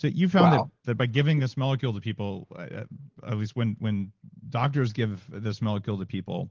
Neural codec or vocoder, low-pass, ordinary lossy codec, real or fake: none; 7.2 kHz; Opus, 16 kbps; real